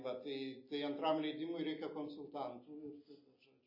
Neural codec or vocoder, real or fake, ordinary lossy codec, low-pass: none; real; MP3, 24 kbps; 5.4 kHz